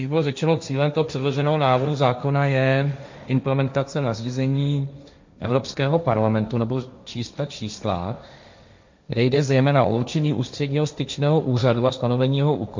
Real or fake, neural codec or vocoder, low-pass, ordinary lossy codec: fake; codec, 16 kHz, 1.1 kbps, Voila-Tokenizer; 7.2 kHz; MP3, 64 kbps